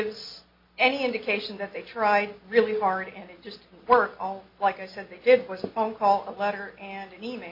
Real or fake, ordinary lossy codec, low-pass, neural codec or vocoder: real; MP3, 32 kbps; 5.4 kHz; none